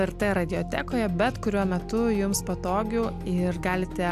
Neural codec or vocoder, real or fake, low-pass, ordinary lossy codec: none; real; 14.4 kHz; AAC, 96 kbps